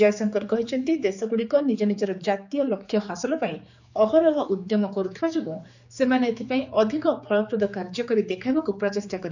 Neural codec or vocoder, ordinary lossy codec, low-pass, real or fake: codec, 16 kHz, 4 kbps, X-Codec, HuBERT features, trained on general audio; none; 7.2 kHz; fake